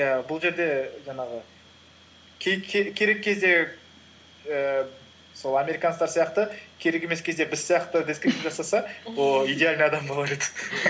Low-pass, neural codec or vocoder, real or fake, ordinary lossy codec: none; none; real; none